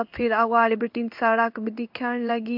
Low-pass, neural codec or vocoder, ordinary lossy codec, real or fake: 5.4 kHz; codec, 16 kHz in and 24 kHz out, 1 kbps, XY-Tokenizer; MP3, 48 kbps; fake